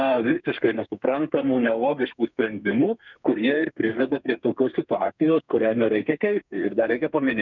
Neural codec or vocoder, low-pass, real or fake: codec, 32 kHz, 1.9 kbps, SNAC; 7.2 kHz; fake